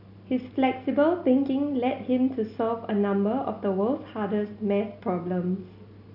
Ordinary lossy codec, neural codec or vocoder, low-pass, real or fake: none; none; 5.4 kHz; real